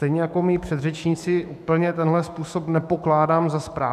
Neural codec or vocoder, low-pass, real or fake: autoencoder, 48 kHz, 128 numbers a frame, DAC-VAE, trained on Japanese speech; 14.4 kHz; fake